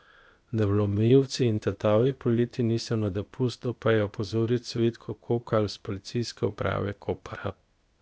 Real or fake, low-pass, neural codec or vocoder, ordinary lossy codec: fake; none; codec, 16 kHz, 0.8 kbps, ZipCodec; none